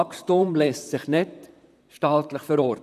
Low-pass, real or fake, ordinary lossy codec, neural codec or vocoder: 14.4 kHz; fake; none; vocoder, 44.1 kHz, 128 mel bands, Pupu-Vocoder